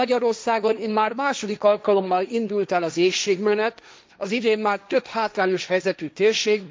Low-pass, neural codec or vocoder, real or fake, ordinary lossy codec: none; codec, 16 kHz, 1.1 kbps, Voila-Tokenizer; fake; none